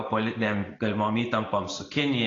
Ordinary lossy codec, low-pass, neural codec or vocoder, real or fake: AAC, 32 kbps; 7.2 kHz; none; real